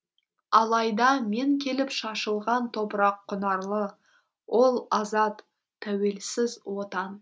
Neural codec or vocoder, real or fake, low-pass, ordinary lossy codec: none; real; none; none